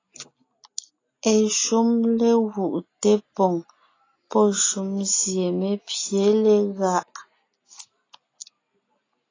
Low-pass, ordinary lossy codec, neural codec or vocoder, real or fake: 7.2 kHz; AAC, 32 kbps; none; real